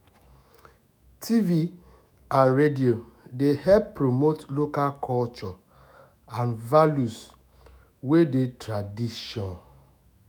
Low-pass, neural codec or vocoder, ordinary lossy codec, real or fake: none; autoencoder, 48 kHz, 128 numbers a frame, DAC-VAE, trained on Japanese speech; none; fake